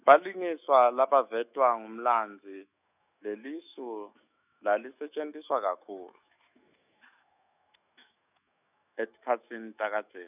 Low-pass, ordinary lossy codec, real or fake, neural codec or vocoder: 3.6 kHz; none; fake; autoencoder, 48 kHz, 128 numbers a frame, DAC-VAE, trained on Japanese speech